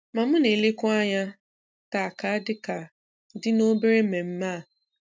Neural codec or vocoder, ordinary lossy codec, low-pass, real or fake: none; none; none; real